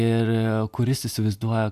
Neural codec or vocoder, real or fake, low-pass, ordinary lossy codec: none; real; 14.4 kHz; AAC, 96 kbps